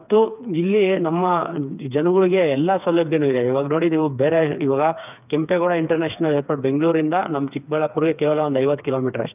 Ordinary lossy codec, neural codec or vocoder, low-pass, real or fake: none; codec, 16 kHz, 4 kbps, FreqCodec, smaller model; 3.6 kHz; fake